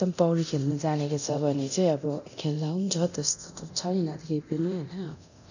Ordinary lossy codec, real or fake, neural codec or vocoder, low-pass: none; fake; codec, 24 kHz, 0.9 kbps, DualCodec; 7.2 kHz